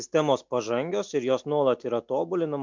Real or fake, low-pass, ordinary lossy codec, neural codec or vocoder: real; 7.2 kHz; MP3, 64 kbps; none